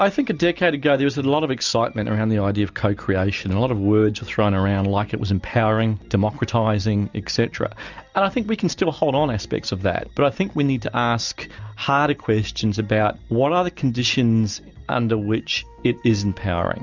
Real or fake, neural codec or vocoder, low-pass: real; none; 7.2 kHz